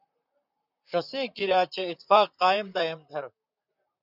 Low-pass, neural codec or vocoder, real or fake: 5.4 kHz; vocoder, 44.1 kHz, 128 mel bands, Pupu-Vocoder; fake